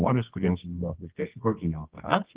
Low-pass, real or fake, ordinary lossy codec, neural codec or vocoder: 3.6 kHz; fake; Opus, 16 kbps; codec, 24 kHz, 0.9 kbps, WavTokenizer, medium music audio release